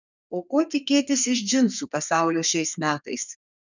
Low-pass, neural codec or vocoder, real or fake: 7.2 kHz; codec, 32 kHz, 1.9 kbps, SNAC; fake